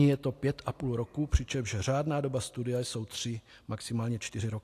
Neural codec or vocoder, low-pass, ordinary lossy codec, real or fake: none; 14.4 kHz; AAC, 64 kbps; real